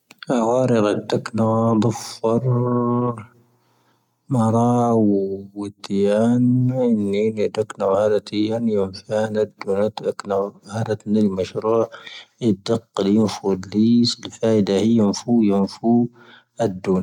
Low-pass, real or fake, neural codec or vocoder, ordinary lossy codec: 19.8 kHz; real; none; none